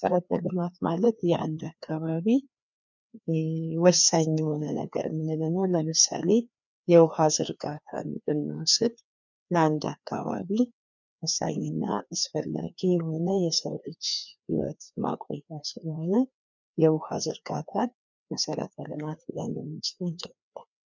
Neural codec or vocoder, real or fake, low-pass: codec, 16 kHz, 2 kbps, FreqCodec, larger model; fake; 7.2 kHz